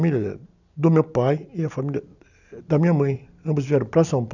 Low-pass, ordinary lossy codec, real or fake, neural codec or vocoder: 7.2 kHz; none; real; none